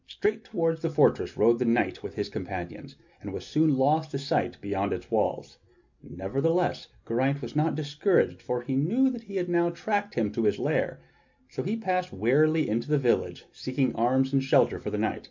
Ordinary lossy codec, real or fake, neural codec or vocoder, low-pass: MP3, 64 kbps; real; none; 7.2 kHz